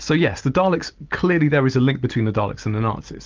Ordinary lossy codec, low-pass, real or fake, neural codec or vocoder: Opus, 32 kbps; 7.2 kHz; real; none